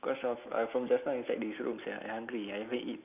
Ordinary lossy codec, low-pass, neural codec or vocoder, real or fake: none; 3.6 kHz; none; real